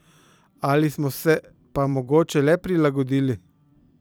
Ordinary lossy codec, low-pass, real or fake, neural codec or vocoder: none; none; fake; vocoder, 44.1 kHz, 128 mel bands every 256 samples, BigVGAN v2